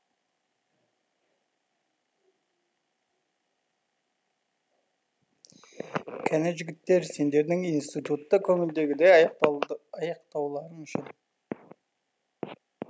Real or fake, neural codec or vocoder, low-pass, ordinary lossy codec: real; none; none; none